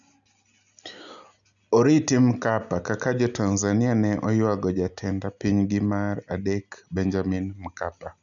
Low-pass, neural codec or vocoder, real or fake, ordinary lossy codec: 7.2 kHz; none; real; none